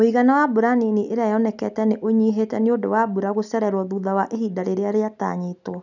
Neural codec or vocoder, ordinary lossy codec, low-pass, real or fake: none; none; 7.2 kHz; real